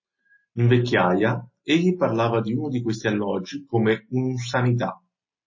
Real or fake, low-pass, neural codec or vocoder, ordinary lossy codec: real; 7.2 kHz; none; MP3, 32 kbps